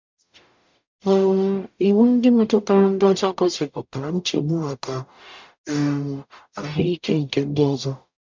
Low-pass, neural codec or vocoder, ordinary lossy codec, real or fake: 7.2 kHz; codec, 44.1 kHz, 0.9 kbps, DAC; MP3, 64 kbps; fake